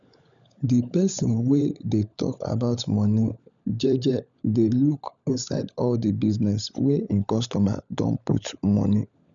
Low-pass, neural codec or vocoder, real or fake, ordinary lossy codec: 7.2 kHz; codec, 16 kHz, 16 kbps, FunCodec, trained on LibriTTS, 50 frames a second; fake; none